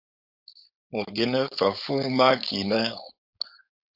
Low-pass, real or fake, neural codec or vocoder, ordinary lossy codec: 5.4 kHz; fake; codec, 16 kHz, 4.8 kbps, FACodec; AAC, 48 kbps